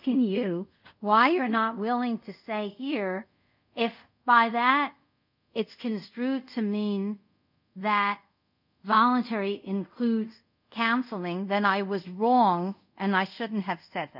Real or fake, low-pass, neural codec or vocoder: fake; 5.4 kHz; codec, 24 kHz, 0.5 kbps, DualCodec